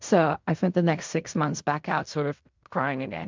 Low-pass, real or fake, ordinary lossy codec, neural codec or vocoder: 7.2 kHz; fake; MP3, 64 kbps; codec, 16 kHz in and 24 kHz out, 0.4 kbps, LongCat-Audio-Codec, fine tuned four codebook decoder